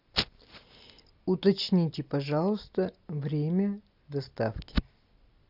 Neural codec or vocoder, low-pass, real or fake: none; 5.4 kHz; real